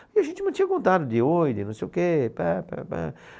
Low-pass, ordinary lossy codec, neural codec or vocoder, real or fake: none; none; none; real